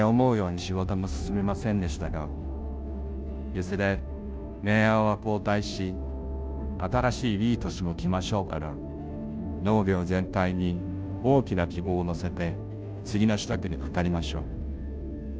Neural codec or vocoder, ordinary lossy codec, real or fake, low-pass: codec, 16 kHz, 0.5 kbps, FunCodec, trained on Chinese and English, 25 frames a second; none; fake; none